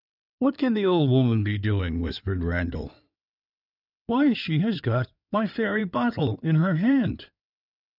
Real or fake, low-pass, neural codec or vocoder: fake; 5.4 kHz; codec, 16 kHz in and 24 kHz out, 2.2 kbps, FireRedTTS-2 codec